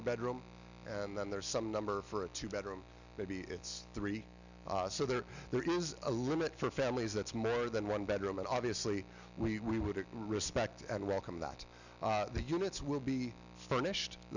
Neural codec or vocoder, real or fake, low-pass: none; real; 7.2 kHz